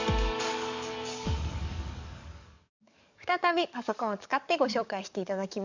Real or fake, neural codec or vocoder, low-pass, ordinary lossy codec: fake; codec, 44.1 kHz, 7.8 kbps, DAC; 7.2 kHz; none